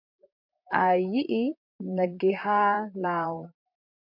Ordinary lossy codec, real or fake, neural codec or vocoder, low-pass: MP3, 48 kbps; fake; vocoder, 44.1 kHz, 128 mel bands, Pupu-Vocoder; 5.4 kHz